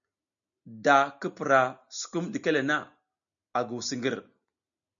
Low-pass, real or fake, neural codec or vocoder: 7.2 kHz; real; none